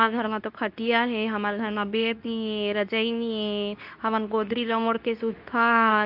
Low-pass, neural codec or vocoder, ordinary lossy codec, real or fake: 5.4 kHz; codec, 24 kHz, 0.9 kbps, WavTokenizer, medium speech release version 2; AAC, 48 kbps; fake